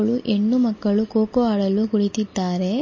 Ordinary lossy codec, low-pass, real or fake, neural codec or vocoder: MP3, 32 kbps; 7.2 kHz; real; none